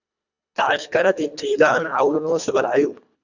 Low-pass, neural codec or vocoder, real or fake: 7.2 kHz; codec, 24 kHz, 1.5 kbps, HILCodec; fake